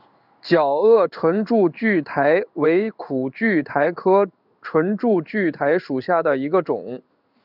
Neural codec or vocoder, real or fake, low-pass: codec, 16 kHz in and 24 kHz out, 1 kbps, XY-Tokenizer; fake; 5.4 kHz